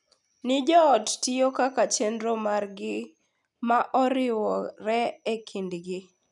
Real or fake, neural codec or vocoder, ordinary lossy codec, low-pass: real; none; none; 10.8 kHz